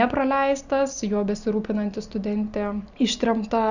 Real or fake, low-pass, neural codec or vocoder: real; 7.2 kHz; none